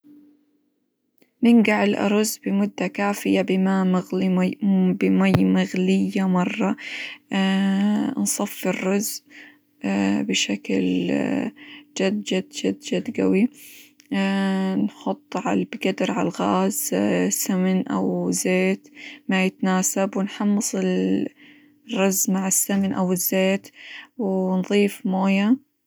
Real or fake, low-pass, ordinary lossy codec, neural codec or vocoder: real; none; none; none